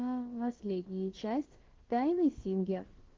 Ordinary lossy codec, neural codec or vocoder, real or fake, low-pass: Opus, 16 kbps; codec, 16 kHz, about 1 kbps, DyCAST, with the encoder's durations; fake; 7.2 kHz